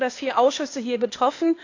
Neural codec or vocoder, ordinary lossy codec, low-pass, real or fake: codec, 16 kHz, 0.8 kbps, ZipCodec; AAC, 48 kbps; 7.2 kHz; fake